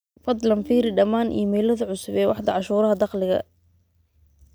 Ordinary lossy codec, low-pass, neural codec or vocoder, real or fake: none; none; none; real